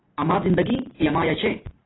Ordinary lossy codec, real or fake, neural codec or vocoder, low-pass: AAC, 16 kbps; real; none; 7.2 kHz